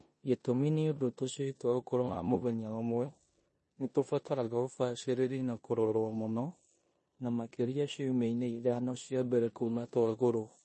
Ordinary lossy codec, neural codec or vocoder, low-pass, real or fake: MP3, 32 kbps; codec, 16 kHz in and 24 kHz out, 0.9 kbps, LongCat-Audio-Codec, four codebook decoder; 10.8 kHz; fake